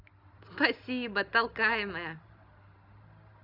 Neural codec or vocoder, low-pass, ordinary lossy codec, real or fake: none; 5.4 kHz; none; real